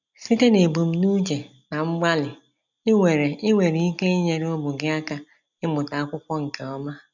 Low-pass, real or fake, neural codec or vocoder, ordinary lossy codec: 7.2 kHz; real; none; none